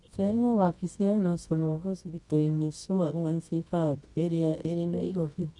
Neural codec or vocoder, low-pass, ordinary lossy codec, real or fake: codec, 24 kHz, 0.9 kbps, WavTokenizer, medium music audio release; 10.8 kHz; none; fake